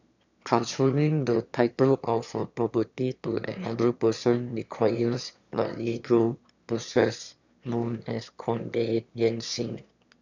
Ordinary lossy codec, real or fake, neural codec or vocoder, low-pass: none; fake; autoencoder, 22.05 kHz, a latent of 192 numbers a frame, VITS, trained on one speaker; 7.2 kHz